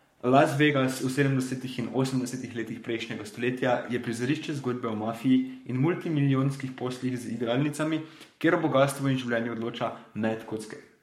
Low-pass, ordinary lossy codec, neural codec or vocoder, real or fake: 19.8 kHz; MP3, 64 kbps; codec, 44.1 kHz, 7.8 kbps, Pupu-Codec; fake